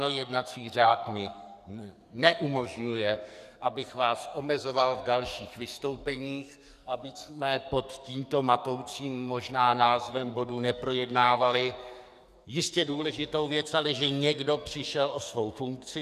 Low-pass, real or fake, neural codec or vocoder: 14.4 kHz; fake; codec, 44.1 kHz, 2.6 kbps, SNAC